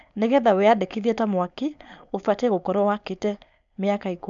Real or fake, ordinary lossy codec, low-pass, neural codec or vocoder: fake; none; 7.2 kHz; codec, 16 kHz, 4.8 kbps, FACodec